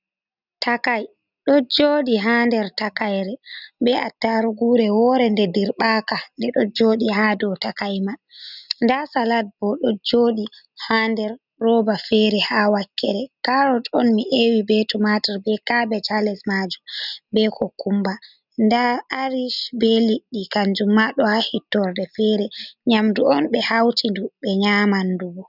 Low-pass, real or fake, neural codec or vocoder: 5.4 kHz; real; none